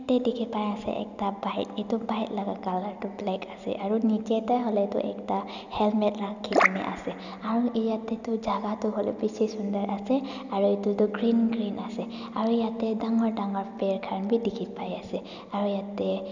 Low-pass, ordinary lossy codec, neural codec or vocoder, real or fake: 7.2 kHz; none; none; real